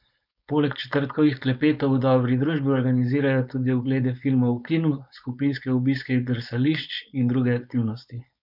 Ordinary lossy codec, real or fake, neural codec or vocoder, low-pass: none; fake; codec, 16 kHz, 4.8 kbps, FACodec; 5.4 kHz